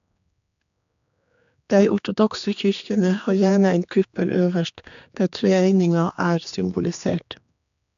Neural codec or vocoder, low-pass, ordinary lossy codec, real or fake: codec, 16 kHz, 2 kbps, X-Codec, HuBERT features, trained on general audio; 7.2 kHz; AAC, 96 kbps; fake